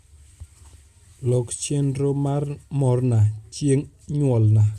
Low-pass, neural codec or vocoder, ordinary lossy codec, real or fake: 14.4 kHz; none; Opus, 64 kbps; real